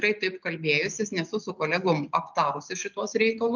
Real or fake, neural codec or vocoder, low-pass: real; none; 7.2 kHz